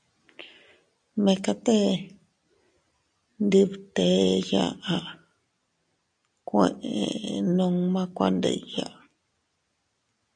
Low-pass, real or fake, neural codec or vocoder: 9.9 kHz; real; none